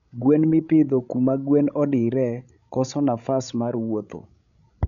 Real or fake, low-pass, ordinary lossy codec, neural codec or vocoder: fake; 7.2 kHz; none; codec, 16 kHz, 16 kbps, FreqCodec, larger model